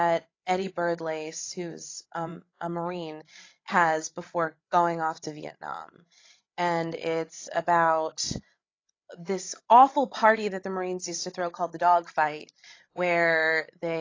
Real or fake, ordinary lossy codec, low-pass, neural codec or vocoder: fake; AAC, 32 kbps; 7.2 kHz; codec, 16 kHz, 16 kbps, FreqCodec, larger model